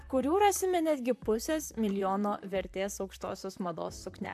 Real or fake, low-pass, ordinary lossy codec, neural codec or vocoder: fake; 14.4 kHz; AAC, 96 kbps; vocoder, 44.1 kHz, 128 mel bands, Pupu-Vocoder